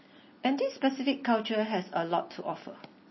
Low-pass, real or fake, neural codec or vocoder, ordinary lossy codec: 7.2 kHz; real; none; MP3, 24 kbps